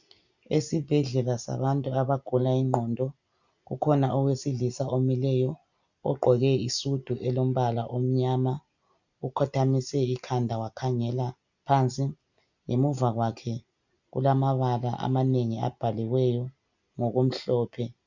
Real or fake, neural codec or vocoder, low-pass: real; none; 7.2 kHz